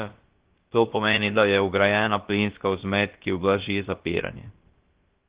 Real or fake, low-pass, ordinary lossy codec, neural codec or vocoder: fake; 3.6 kHz; Opus, 16 kbps; codec, 16 kHz, about 1 kbps, DyCAST, with the encoder's durations